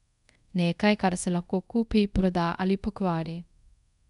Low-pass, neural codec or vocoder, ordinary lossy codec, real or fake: 10.8 kHz; codec, 24 kHz, 0.5 kbps, DualCodec; none; fake